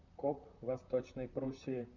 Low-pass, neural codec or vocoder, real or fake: 7.2 kHz; vocoder, 44.1 kHz, 128 mel bands, Pupu-Vocoder; fake